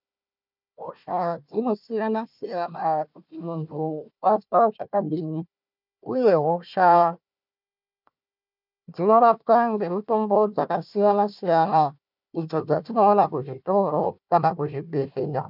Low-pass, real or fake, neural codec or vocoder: 5.4 kHz; fake; codec, 16 kHz, 1 kbps, FunCodec, trained on Chinese and English, 50 frames a second